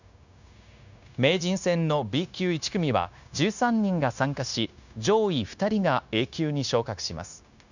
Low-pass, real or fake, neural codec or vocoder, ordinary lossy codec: 7.2 kHz; fake; codec, 16 kHz, 0.9 kbps, LongCat-Audio-Codec; none